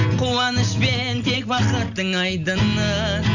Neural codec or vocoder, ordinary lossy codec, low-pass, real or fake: none; none; 7.2 kHz; real